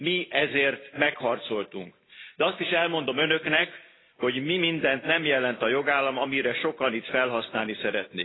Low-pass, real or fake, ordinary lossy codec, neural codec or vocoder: 7.2 kHz; real; AAC, 16 kbps; none